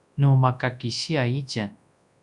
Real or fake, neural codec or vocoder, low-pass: fake; codec, 24 kHz, 0.9 kbps, WavTokenizer, large speech release; 10.8 kHz